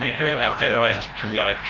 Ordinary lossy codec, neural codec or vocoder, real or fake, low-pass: Opus, 32 kbps; codec, 16 kHz, 0.5 kbps, FreqCodec, larger model; fake; 7.2 kHz